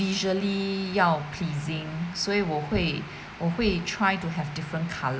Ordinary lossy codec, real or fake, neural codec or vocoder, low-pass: none; real; none; none